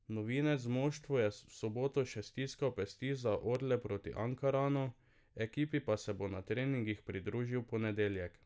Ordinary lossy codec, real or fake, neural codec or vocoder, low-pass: none; real; none; none